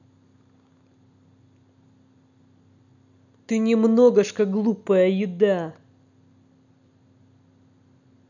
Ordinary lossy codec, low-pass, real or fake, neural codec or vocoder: none; 7.2 kHz; real; none